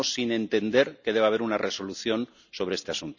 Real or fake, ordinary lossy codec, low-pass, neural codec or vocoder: real; none; 7.2 kHz; none